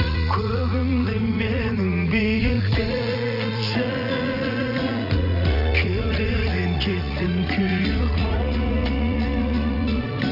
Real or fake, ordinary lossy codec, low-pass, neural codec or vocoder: fake; none; 5.4 kHz; vocoder, 44.1 kHz, 80 mel bands, Vocos